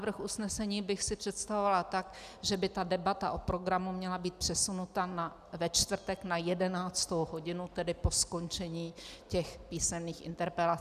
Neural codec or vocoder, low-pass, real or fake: none; 14.4 kHz; real